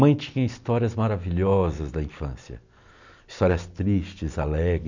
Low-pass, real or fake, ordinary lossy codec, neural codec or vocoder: 7.2 kHz; real; none; none